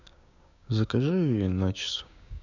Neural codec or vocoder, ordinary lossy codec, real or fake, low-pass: codec, 16 kHz, 6 kbps, DAC; none; fake; 7.2 kHz